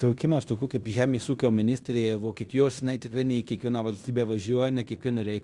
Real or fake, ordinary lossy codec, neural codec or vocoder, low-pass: fake; Opus, 64 kbps; codec, 16 kHz in and 24 kHz out, 0.9 kbps, LongCat-Audio-Codec, fine tuned four codebook decoder; 10.8 kHz